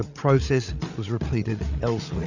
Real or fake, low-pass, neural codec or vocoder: fake; 7.2 kHz; codec, 16 kHz, 8 kbps, FreqCodec, larger model